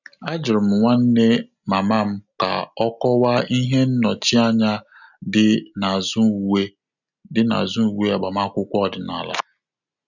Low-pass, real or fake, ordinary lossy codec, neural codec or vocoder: 7.2 kHz; real; none; none